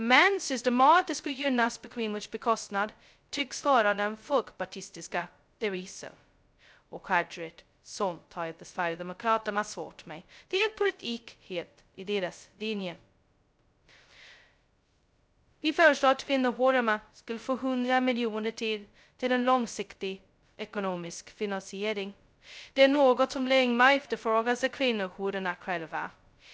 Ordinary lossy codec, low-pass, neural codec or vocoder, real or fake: none; none; codec, 16 kHz, 0.2 kbps, FocalCodec; fake